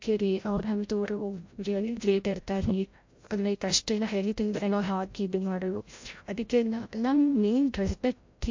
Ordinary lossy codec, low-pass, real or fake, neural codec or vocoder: MP3, 48 kbps; 7.2 kHz; fake; codec, 16 kHz, 0.5 kbps, FreqCodec, larger model